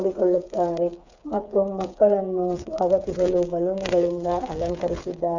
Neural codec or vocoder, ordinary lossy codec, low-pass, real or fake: codec, 16 kHz, 8 kbps, FreqCodec, smaller model; none; 7.2 kHz; fake